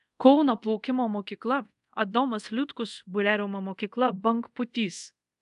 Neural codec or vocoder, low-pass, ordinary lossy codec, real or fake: codec, 24 kHz, 0.5 kbps, DualCodec; 10.8 kHz; AAC, 96 kbps; fake